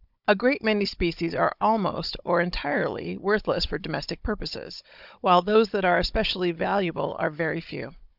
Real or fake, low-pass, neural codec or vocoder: fake; 5.4 kHz; codec, 16 kHz, 16 kbps, FunCodec, trained on Chinese and English, 50 frames a second